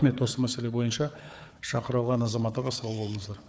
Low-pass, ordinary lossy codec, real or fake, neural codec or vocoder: none; none; fake; codec, 16 kHz, 4 kbps, FunCodec, trained on Chinese and English, 50 frames a second